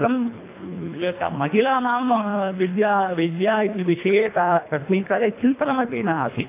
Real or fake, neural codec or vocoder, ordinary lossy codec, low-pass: fake; codec, 24 kHz, 1.5 kbps, HILCodec; AAC, 24 kbps; 3.6 kHz